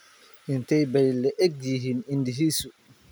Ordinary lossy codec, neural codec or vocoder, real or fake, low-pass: none; none; real; none